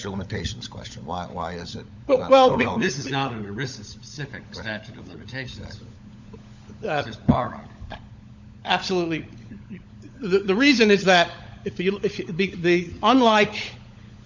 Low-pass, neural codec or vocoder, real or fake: 7.2 kHz; codec, 16 kHz, 16 kbps, FunCodec, trained on LibriTTS, 50 frames a second; fake